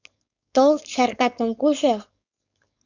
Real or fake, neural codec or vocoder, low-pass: fake; codec, 16 kHz, 4.8 kbps, FACodec; 7.2 kHz